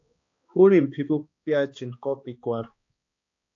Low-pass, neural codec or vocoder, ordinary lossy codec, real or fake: 7.2 kHz; codec, 16 kHz, 2 kbps, X-Codec, HuBERT features, trained on balanced general audio; AAC, 48 kbps; fake